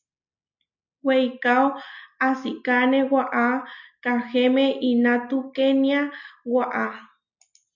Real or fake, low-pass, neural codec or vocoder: real; 7.2 kHz; none